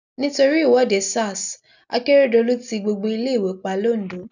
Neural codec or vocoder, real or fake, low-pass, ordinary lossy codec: none; real; 7.2 kHz; none